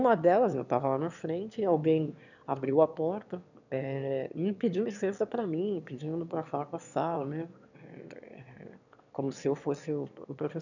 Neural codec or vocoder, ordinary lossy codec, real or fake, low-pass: autoencoder, 22.05 kHz, a latent of 192 numbers a frame, VITS, trained on one speaker; none; fake; 7.2 kHz